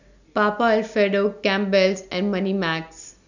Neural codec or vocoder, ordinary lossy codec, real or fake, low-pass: none; none; real; 7.2 kHz